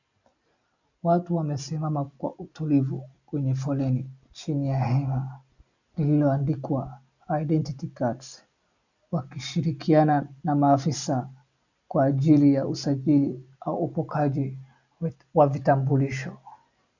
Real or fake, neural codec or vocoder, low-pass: real; none; 7.2 kHz